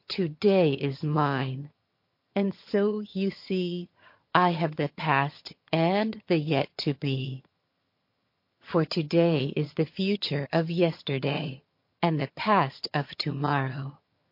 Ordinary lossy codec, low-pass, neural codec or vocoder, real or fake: MP3, 32 kbps; 5.4 kHz; vocoder, 22.05 kHz, 80 mel bands, HiFi-GAN; fake